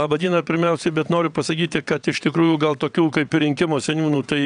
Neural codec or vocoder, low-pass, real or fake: vocoder, 22.05 kHz, 80 mel bands, WaveNeXt; 9.9 kHz; fake